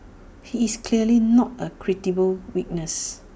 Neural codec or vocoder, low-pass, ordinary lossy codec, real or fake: none; none; none; real